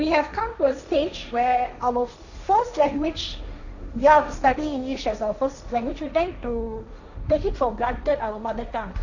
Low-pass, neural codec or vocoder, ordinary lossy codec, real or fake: 7.2 kHz; codec, 16 kHz, 1.1 kbps, Voila-Tokenizer; none; fake